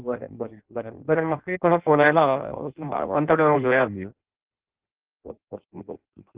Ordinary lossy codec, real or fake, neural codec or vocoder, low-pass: Opus, 16 kbps; fake; codec, 16 kHz in and 24 kHz out, 0.6 kbps, FireRedTTS-2 codec; 3.6 kHz